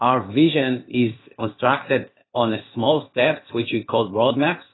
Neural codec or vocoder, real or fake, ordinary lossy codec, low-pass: codec, 16 kHz, 0.8 kbps, ZipCodec; fake; AAC, 16 kbps; 7.2 kHz